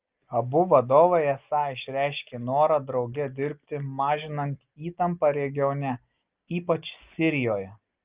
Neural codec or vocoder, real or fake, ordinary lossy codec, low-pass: none; real; Opus, 32 kbps; 3.6 kHz